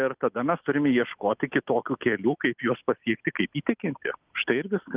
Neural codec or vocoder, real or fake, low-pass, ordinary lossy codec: none; real; 3.6 kHz; Opus, 24 kbps